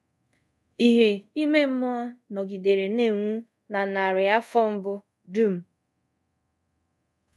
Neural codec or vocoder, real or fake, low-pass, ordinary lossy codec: codec, 24 kHz, 0.5 kbps, DualCodec; fake; none; none